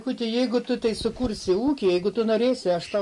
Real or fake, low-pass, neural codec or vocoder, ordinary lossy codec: real; 10.8 kHz; none; MP3, 48 kbps